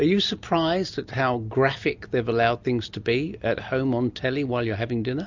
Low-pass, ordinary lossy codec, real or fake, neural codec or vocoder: 7.2 kHz; MP3, 64 kbps; real; none